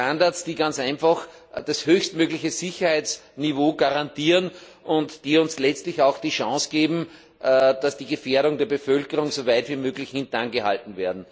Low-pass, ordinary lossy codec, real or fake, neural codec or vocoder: none; none; real; none